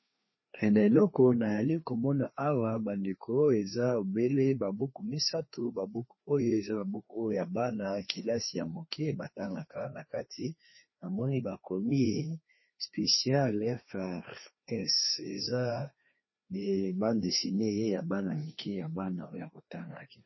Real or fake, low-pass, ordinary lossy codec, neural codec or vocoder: fake; 7.2 kHz; MP3, 24 kbps; codec, 16 kHz, 2 kbps, FreqCodec, larger model